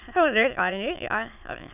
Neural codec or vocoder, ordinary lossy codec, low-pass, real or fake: autoencoder, 22.05 kHz, a latent of 192 numbers a frame, VITS, trained on many speakers; none; 3.6 kHz; fake